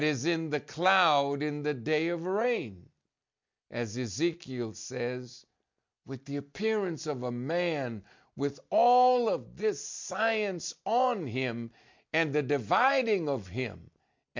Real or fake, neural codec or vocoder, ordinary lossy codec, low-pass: real; none; MP3, 64 kbps; 7.2 kHz